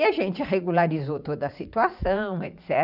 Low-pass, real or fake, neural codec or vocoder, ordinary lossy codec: 5.4 kHz; fake; vocoder, 44.1 kHz, 80 mel bands, Vocos; none